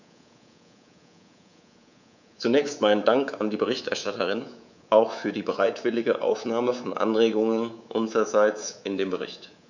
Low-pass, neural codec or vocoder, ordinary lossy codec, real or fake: 7.2 kHz; codec, 24 kHz, 3.1 kbps, DualCodec; none; fake